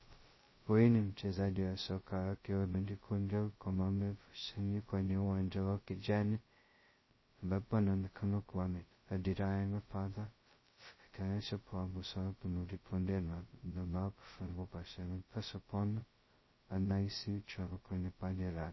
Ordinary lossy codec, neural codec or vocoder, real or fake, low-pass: MP3, 24 kbps; codec, 16 kHz, 0.2 kbps, FocalCodec; fake; 7.2 kHz